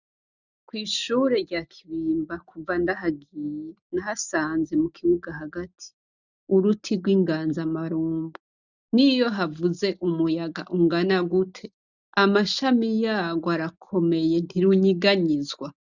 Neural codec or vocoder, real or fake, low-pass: none; real; 7.2 kHz